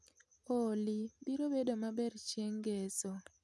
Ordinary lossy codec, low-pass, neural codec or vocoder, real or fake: none; none; none; real